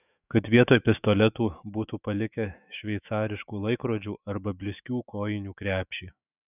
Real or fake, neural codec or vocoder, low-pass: fake; autoencoder, 48 kHz, 128 numbers a frame, DAC-VAE, trained on Japanese speech; 3.6 kHz